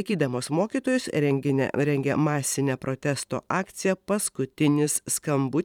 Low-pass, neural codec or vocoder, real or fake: 19.8 kHz; vocoder, 44.1 kHz, 128 mel bands every 512 samples, BigVGAN v2; fake